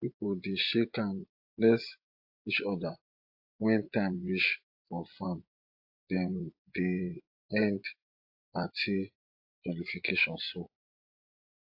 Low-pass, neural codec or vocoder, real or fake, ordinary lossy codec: 5.4 kHz; vocoder, 24 kHz, 100 mel bands, Vocos; fake; AAC, 48 kbps